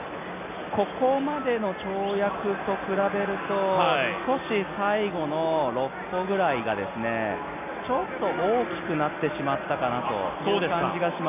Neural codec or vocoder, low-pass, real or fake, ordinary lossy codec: none; 3.6 kHz; real; none